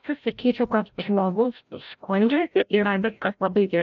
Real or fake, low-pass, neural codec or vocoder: fake; 7.2 kHz; codec, 16 kHz, 0.5 kbps, FreqCodec, larger model